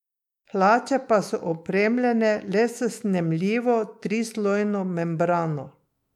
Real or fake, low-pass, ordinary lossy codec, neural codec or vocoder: fake; 19.8 kHz; MP3, 96 kbps; autoencoder, 48 kHz, 128 numbers a frame, DAC-VAE, trained on Japanese speech